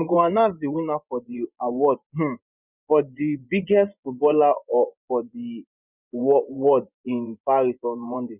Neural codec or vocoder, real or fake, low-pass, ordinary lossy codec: vocoder, 24 kHz, 100 mel bands, Vocos; fake; 3.6 kHz; none